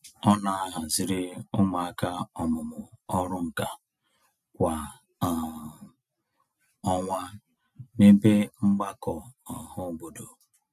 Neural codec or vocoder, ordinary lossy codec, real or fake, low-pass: none; none; real; 14.4 kHz